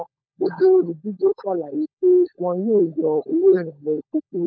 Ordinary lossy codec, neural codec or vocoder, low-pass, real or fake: none; codec, 16 kHz, 16 kbps, FunCodec, trained on LibriTTS, 50 frames a second; none; fake